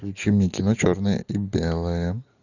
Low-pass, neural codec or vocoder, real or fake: 7.2 kHz; none; real